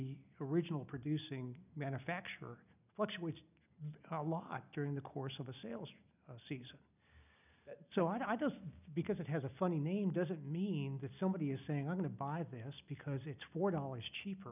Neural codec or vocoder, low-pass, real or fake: none; 3.6 kHz; real